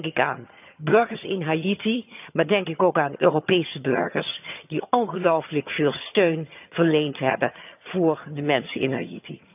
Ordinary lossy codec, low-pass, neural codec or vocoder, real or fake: none; 3.6 kHz; vocoder, 22.05 kHz, 80 mel bands, HiFi-GAN; fake